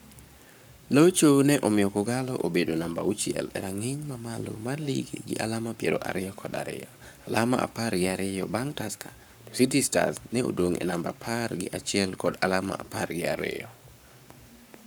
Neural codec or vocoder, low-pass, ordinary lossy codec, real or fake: codec, 44.1 kHz, 7.8 kbps, Pupu-Codec; none; none; fake